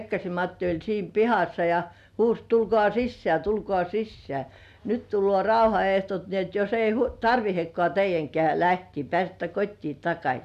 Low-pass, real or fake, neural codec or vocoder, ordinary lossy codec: 14.4 kHz; real; none; none